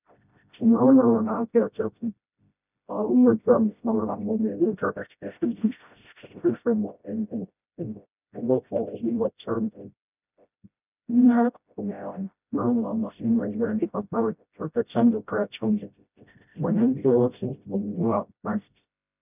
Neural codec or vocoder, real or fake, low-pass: codec, 16 kHz, 0.5 kbps, FreqCodec, smaller model; fake; 3.6 kHz